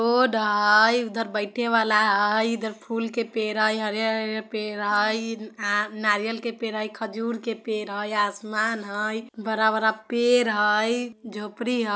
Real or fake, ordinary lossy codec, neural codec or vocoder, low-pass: real; none; none; none